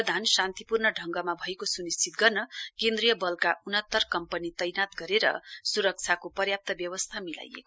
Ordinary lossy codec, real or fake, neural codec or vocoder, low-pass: none; real; none; none